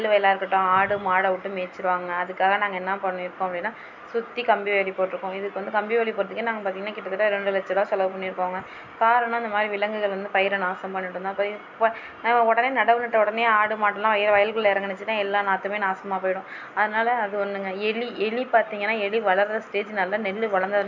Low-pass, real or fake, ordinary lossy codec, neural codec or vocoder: 7.2 kHz; real; MP3, 64 kbps; none